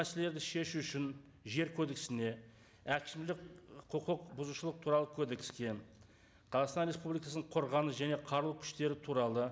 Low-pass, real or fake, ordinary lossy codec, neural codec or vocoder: none; real; none; none